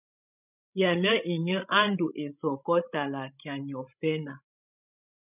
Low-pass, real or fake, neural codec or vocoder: 3.6 kHz; fake; codec, 16 kHz, 16 kbps, FreqCodec, larger model